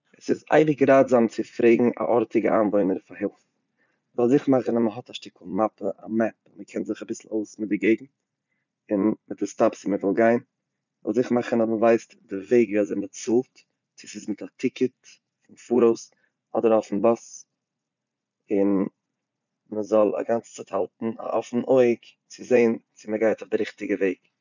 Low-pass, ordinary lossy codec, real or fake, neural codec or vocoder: 7.2 kHz; none; fake; vocoder, 44.1 kHz, 80 mel bands, Vocos